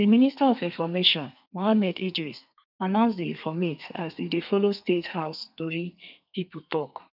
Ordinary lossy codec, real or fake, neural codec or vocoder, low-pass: none; fake; codec, 32 kHz, 1.9 kbps, SNAC; 5.4 kHz